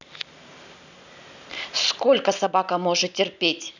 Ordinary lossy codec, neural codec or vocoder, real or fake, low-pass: none; none; real; 7.2 kHz